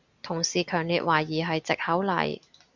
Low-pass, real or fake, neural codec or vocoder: 7.2 kHz; real; none